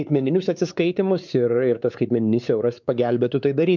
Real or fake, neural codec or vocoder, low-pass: fake; codec, 16 kHz, 4 kbps, X-Codec, WavLM features, trained on Multilingual LibriSpeech; 7.2 kHz